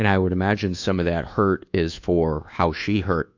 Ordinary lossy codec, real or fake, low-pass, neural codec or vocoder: AAC, 48 kbps; fake; 7.2 kHz; codec, 24 kHz, 1.2 kbps, DualCodec